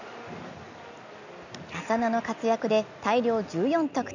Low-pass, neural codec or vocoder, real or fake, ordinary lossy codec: 7.2 kHz; none; real; none